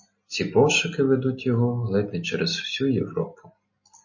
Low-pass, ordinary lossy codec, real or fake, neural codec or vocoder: 7.2 kHz; MP3, 32 kbps; real; none